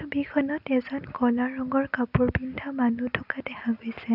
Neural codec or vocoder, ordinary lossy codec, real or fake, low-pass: none; none; real; 5.4 kHz